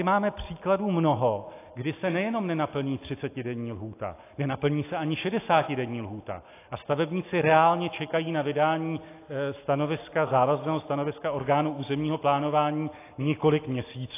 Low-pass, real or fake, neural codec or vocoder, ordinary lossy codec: 3.6 kHz; real; none; AAC, 24 kbps